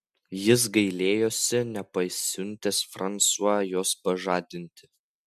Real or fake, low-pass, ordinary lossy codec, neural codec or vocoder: real; 14.4 kHz; MP3, 96 kbps; none